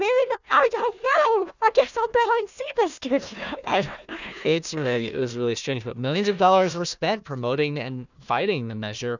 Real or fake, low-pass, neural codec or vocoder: fake; 7.2 kHz; codec, 16 kHz, 1 kbps, FunCodec, trained on Chinese and English, 50 frames a second